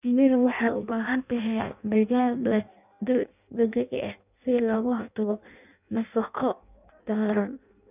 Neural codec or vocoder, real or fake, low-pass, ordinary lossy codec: codec, 16 kHz in and 24 kHz out, 0.6 kbps, FireRedTTS-2 codec; fake; 3.6 kHz; none